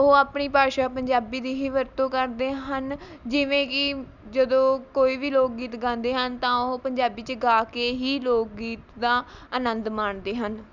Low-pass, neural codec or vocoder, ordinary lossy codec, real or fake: 7.2 kHz; none; none; real